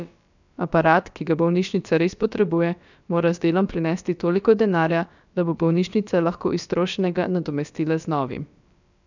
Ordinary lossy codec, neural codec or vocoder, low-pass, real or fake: none; codec, 16 kHz, about 1 kbps, DyCAST, with the encoder's durations; 7.2 kHz; fake